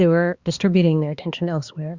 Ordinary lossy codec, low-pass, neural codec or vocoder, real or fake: Opus, 64 kbps; 7.2 kHz; codec, 16 kHz, 2 kbps, X-Codec, HuBERT features, trained on balanced general audio; fake